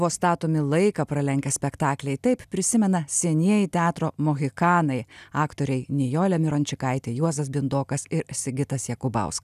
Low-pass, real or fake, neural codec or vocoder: 14.4 kHz; real; none